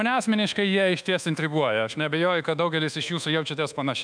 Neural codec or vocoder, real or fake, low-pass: codec, 24 kHz, 1.2 kbps, DualCodec; fake; 9.9 kHz